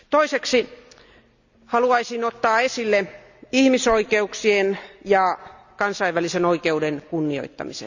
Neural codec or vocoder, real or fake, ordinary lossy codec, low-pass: none; real; none; 7.2 kHz